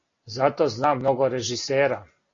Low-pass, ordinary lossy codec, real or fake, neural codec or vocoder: 7.2 kHz; AAC, 32 kbps; real; none